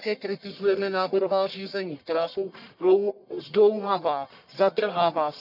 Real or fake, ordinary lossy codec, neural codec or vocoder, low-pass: fake; none; codec, 44.1 kHz, 1.7 kbps, Pupu-Codec; 5.4 kHz